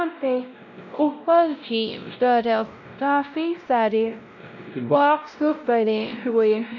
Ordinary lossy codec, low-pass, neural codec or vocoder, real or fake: none; 7.2 kHz; codec, 16 kHz, 0.5 kbps, X-Codec, WavLM features, trained on Multilingual LibriSpeech; fake